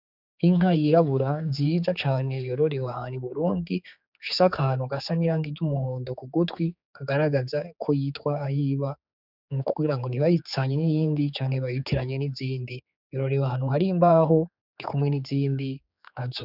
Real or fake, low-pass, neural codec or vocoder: fake; 5.4 kHz; codec, 16 kHz, 4 kbps, X-Codec, HuBERT features, trained on general audio